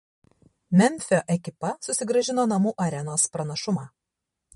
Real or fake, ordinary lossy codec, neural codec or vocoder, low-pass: fake; MP3, 48 kbps; vocoder, 44.1 kHz, 128 mel bands every 512 samples, BigVGAN v2; 19.8 kHz